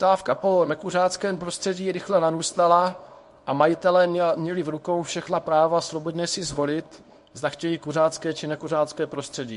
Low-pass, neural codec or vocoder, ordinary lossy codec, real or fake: 10.8 kHz; codec, 24 kHz, 0.9 kbps, WavTokenizer, small release; MP3, 48 kbps; fake